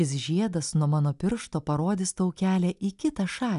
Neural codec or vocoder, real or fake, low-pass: none; real; 10.8 kHz